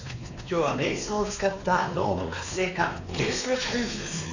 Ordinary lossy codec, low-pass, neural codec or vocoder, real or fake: none; 7.2 kHz; codec, 16 kHz, 2 kbps, X-Codec, WavLM features, trained on Multilingual LibriSpeech; fake